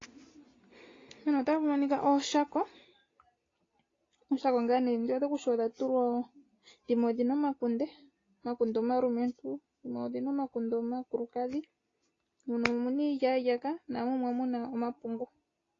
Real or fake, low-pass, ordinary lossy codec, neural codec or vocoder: real; 7.2 kHz; AAC, 32 kbps; none